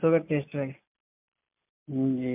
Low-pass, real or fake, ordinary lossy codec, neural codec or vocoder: 3.6 kHz; real; MP3, 32 kbps; none